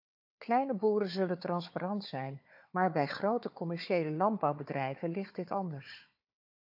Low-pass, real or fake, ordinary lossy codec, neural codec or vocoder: 5.4 kHz; fake; AAC, 48 kbps; codec, 16 kHz, 4 kbps, FunCodec, trained on Chinese and English, 50 frames a second